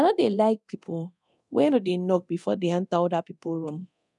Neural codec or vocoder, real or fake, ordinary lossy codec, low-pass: codec, 24 kHz, 0.9 kbps, DualCodec; fake; none; 10.8 kHz